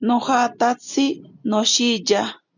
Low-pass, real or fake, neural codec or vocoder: 7.2 kHz; real; none